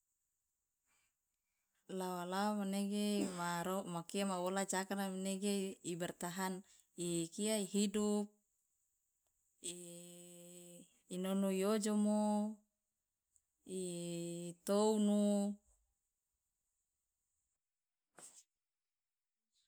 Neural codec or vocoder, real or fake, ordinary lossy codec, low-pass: none; real; none; none